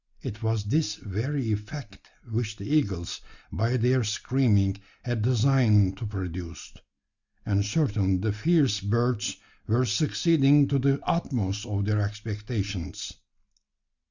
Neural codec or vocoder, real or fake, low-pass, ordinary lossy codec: none; real; 7.2 kHz; Opus, 64 kbps